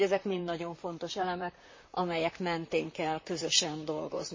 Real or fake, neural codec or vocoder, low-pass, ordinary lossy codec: fake; vocoder, 44.1 kHz, 128 mel bands, Pupu-Vocoder; 7.2 kHz; MP3, 32 kbps